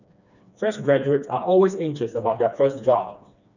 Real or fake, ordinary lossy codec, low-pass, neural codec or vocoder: fake; none; 7.2 kHz; codec, 16 kHz, 2 kbps, FreqCodec, smaller model